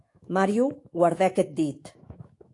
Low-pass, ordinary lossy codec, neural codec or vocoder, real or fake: 10.8 kHz; AAC, 48 kbps; autoencoder, 48 kHz, 128 numbers a frame, DAC-VAE, trained on Japanese speech; fake